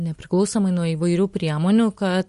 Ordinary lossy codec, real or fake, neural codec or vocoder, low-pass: MP3, 48 kbps; real; none; 14.4 kHz